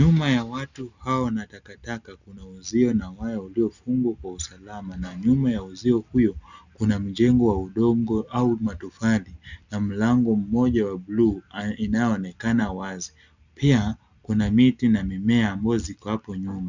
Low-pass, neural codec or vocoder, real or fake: 7.2 kHz; none; real